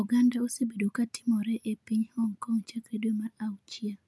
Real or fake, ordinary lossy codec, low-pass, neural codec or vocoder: real; none; none; none